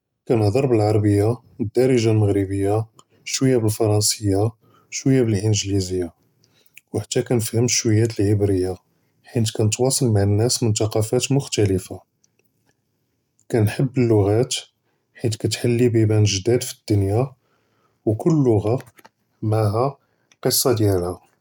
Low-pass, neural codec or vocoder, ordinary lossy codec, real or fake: 19.8 kHz; none; none; real